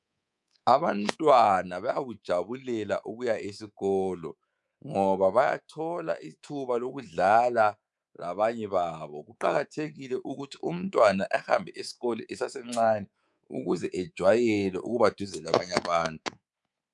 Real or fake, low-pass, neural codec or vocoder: fake; 10.8 kHz; codec, 24 kHz, 3.1 kbps, DualCodec